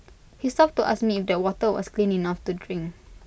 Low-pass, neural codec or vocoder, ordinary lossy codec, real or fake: none; none; none; real